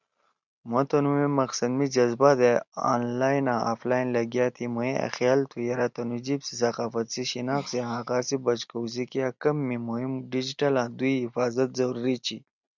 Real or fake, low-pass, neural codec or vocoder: real; 7.2 kHz; none